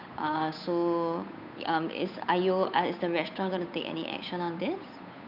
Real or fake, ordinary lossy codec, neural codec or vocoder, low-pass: fake; none; codec, 16 kHz, 8 kbps, FunCodec, trained on Chinese and English, 25 frames a second; 5.4 kHz